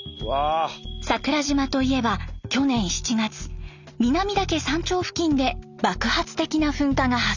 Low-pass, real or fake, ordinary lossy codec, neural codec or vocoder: 7.2 kHz; real; none; none